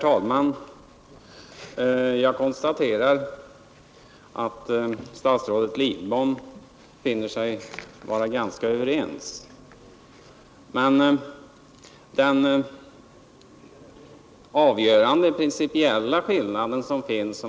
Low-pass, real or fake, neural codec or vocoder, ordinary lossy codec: none; real; none; none